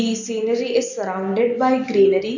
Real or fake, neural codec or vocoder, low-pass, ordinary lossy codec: real; none; 7.2 kHz; none